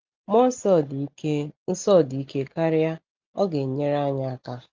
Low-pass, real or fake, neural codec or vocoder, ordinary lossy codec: 7.2 kHz; real; none; Opus, 24 kbps